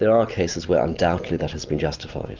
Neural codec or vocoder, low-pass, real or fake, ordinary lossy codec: none; 7.2 kHz; real; Opus, 32 kbps